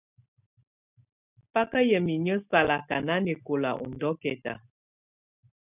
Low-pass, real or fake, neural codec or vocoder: 3.6 kHz; real; none